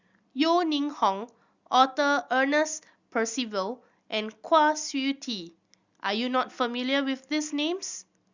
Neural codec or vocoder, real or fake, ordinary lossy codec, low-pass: none; real; Opus, 64 kbps; 7.2 kHz